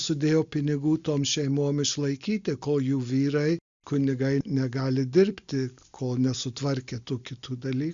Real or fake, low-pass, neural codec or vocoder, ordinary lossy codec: real; 7.2 kHz; none; Opus, 64 kbps